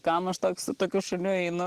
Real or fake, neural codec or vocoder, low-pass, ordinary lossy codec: real; none; 14.4 kHz; Opus, 16 kbps